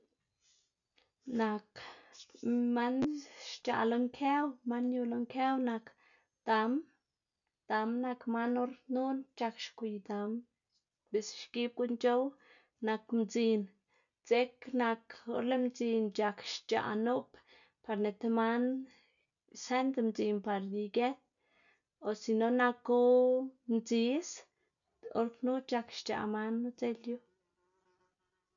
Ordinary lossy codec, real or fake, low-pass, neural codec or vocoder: none; real; 7.2 kHz; none